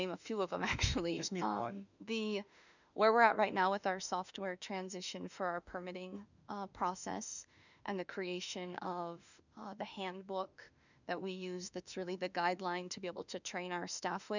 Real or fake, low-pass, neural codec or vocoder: fake; 7.2 kHz; autoencoder, 48 kHz, 32 numbers a frame, DAC-VAE, trained on Japanese speech